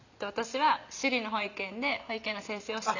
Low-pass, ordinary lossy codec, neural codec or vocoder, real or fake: 7.2 kHz; none; none; real